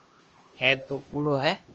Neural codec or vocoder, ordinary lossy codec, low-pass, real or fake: codec, 16 kHz, 1 kbps, X-Codec, HuBERT features, trained on LibriSpeech; Opus, 24 kbps; 7.2 kHz; fake